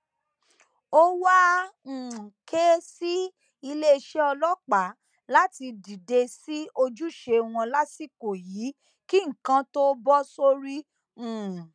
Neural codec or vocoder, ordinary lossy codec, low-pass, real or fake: none; none; 9.9 kHz; real